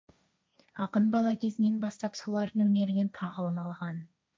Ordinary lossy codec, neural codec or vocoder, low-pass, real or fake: none; codec, 16 kHz, 1.1 kbps, Voila-Tokenizer; none; fake